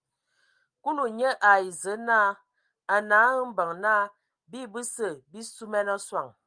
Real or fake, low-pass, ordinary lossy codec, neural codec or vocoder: real; 9.9 kHz; Opus, 32 kbps; none